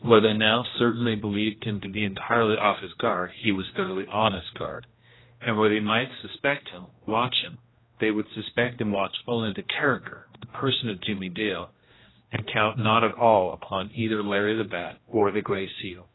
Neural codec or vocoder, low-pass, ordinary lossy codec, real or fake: codec, 16 kHz, 1 kbps, X-Codec, HuBERT features, trained on general audio; 7.2 kHz; AAC, 16 kbps; fake